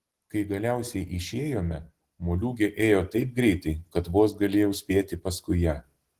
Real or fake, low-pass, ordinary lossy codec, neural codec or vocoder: real; 14.4 kHz; Opus, 16 kbps; none